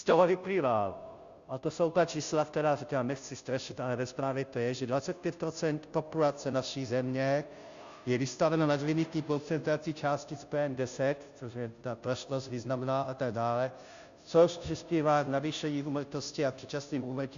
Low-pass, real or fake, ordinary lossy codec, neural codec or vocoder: 7.2 kHz; fake; Opus, 64 kbps; codec, 16 kHz, 0.5 kbps, FunCodec, trained on Chinese and English, 25 frames a second